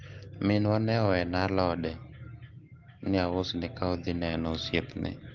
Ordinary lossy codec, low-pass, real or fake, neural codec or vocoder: Opus, 16 kbps; 7.2 kHz; real; none